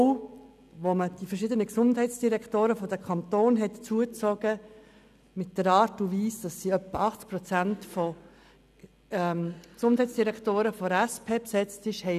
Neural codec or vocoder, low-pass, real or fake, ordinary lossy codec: none; 14.4 kHz; real; none